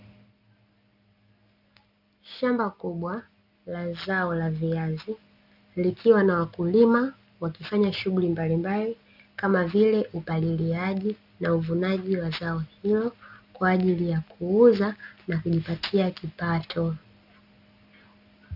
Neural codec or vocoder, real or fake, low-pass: none; real; 5.4 kHz